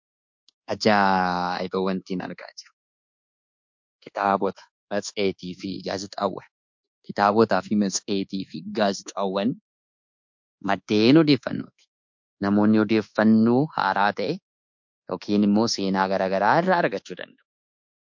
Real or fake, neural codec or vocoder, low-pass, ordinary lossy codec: fake; codec, 24 kHz, 1.2 kbps, DualCodec; 7.2 kHz; MP3, 48 kbps